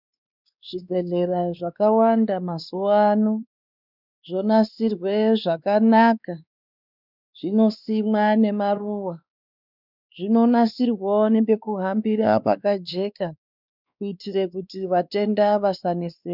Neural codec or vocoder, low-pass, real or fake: codec, 16 kHz, 2 kbps, X-Codec, WavLM features, trained on Multilingual LibriSpeech; 5.4 kHz; fake